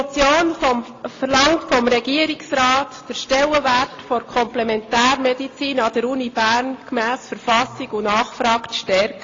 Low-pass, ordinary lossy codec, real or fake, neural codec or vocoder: 7.2 kHz; AAC, 32 kbps; real; none